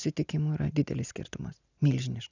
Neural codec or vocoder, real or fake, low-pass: none; real; 7.2 kHz